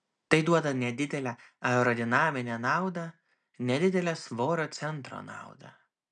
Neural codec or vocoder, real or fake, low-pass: none; real; 9.9 kHz